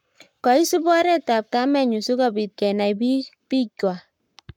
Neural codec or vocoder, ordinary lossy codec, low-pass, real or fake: codec, 44.1 kHz, 7.8 kbps, Pupu-Codec; none; 19.8 kHz; fake